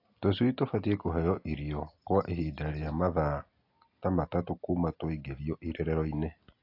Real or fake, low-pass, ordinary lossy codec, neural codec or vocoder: real; 5.4 kHz; AAC, 32 kbps; none